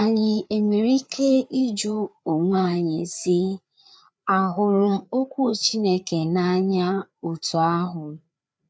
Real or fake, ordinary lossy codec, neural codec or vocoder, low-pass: fake; none; codec, 16 kHz, 4 kbps, FreqCodec, larger model; none